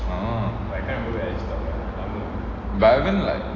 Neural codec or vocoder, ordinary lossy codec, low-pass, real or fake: none; AAC, 32 kbps; 7.2 kHz; real